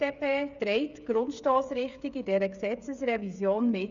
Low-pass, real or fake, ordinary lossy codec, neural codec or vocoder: 7.2 kHz; fake; none; codec, 16 kHz, 8 kbps, FreqCodec, smaller model